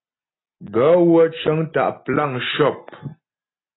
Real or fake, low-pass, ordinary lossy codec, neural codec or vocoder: real; 7.2 kHz; AAC, 16 kbps; none